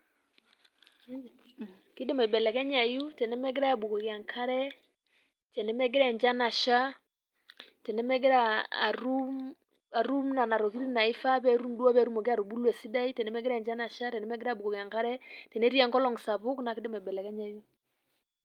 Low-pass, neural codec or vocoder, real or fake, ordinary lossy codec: 19.8 kHz; none; real; Opus, 32 kbps